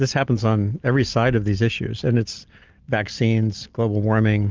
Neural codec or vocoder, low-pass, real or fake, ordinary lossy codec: none; 7.2 kHz; real; Opus, 24 kbps